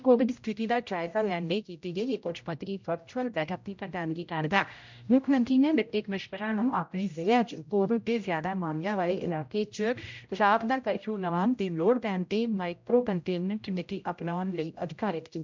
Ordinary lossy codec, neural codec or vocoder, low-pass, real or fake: MP3, 64 kbps; codec, 16 kHz, 0.5 kbps, X-Codec, HuBERT features, trained on general audio; 7.2 kHz; fake